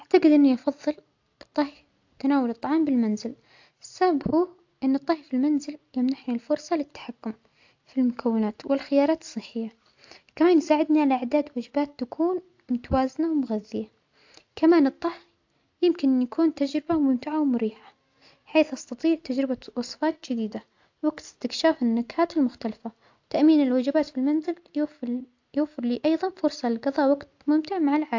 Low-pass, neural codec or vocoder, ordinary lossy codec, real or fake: 7.2 kHz; none; AAC, 48 kbps; real